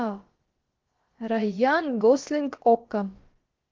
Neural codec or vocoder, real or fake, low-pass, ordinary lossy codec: codec, 16 kHz, about 1 kbps, DyCAST, with the encoder's durations; fake; 7.2 kHz; Opus, 16 kbps